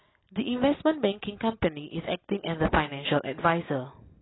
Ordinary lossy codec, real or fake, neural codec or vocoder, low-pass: AAC, 16 kbps; real; none; 7.2 kHz